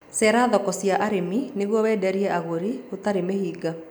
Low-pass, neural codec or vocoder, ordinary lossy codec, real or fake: 19.8 kHz; none; none; real